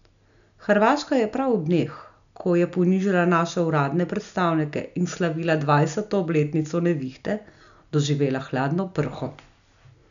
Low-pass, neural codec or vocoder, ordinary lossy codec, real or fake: 7.2 kHz; none; none; real